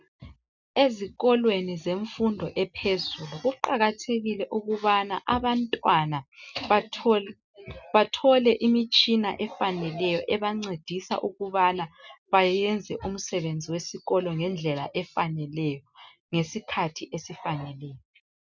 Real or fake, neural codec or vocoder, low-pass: real; none; 7.2 kHz